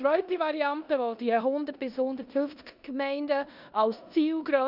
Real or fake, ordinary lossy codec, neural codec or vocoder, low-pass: fake; none; codec, 16 kHz in and 24 kHz out, 0.9 kbps, LongCat-Audio-Codec, four codebook decoder; 5.4 kHz